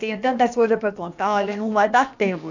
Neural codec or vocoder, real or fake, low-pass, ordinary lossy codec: codec, 16 kHz, about 1 kbps, DyCAST, with the encoder's durations; fake; 7.2 kHz; none